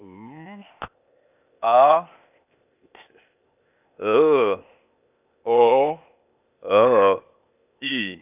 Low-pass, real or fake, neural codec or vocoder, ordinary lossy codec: 3.6 kHz; fake; codec, 16 kHz, 0.8 kbps, ZipCodec; none